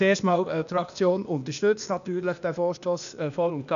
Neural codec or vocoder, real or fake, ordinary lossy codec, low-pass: codec, 16 kHz, 0.8 kbps, ZipCodec; fake; none; 7.2 kHz